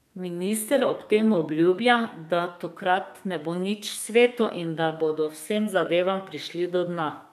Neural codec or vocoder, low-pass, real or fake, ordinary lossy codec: codec, 32 kHz, 1.9 kbps, SNAC; 14.4 kHz; fake; none